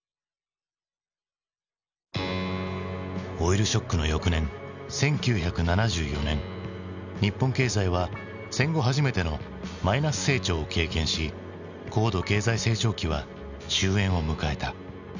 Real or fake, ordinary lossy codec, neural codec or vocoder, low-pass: real; none; none; 7.2 kHz